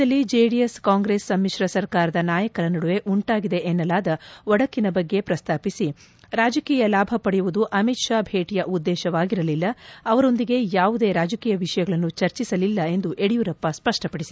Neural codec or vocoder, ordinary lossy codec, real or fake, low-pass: none; none; real; none